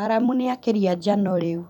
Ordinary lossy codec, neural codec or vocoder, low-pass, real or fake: none; vocoder, 44.1 kHz, 128 mel bands every 256 samples, BigVGAN v2; 19.8 kHz; fake